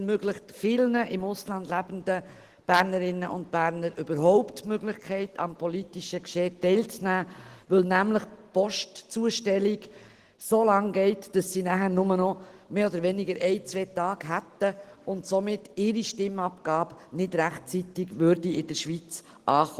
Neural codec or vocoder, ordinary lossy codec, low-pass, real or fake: none; Opus, 16 kbps; 14.4 kHz; real